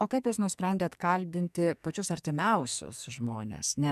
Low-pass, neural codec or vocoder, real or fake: 14.4 kHz; codec, 44.1 kHz, 2.6 kbps, SNAC; fake